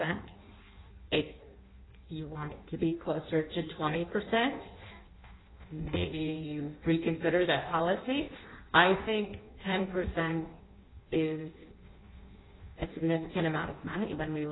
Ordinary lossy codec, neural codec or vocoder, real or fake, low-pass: AAC, 16 kbps; codec, 16 kHz in and 24 kHz out, 0.6 kbps, FireRedTTS-2 codec; fake; 7.2 kHz